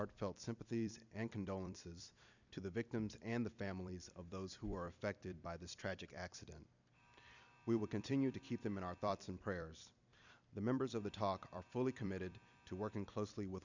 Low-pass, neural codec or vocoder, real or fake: 7.2 kHz; vocoder, 44.1 kHz, 128 mel bands every 256 samples, BigVGAN v2; fake